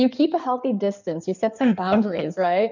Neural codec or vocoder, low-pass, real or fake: codec, 16 kHz in and 24 kHz out, 2.2 kbps, FireRedTTS-2 codec; 7.2 kHz; fake